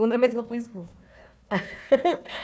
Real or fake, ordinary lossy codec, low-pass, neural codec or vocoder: fake; none; none; codec, 16 kHz, 1 kbps, FunCodec, trained on Chinese and English, 50 frames a second